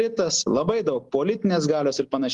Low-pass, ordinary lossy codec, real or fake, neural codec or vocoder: 10.8 kHz; Opus, 24 kbps; real; none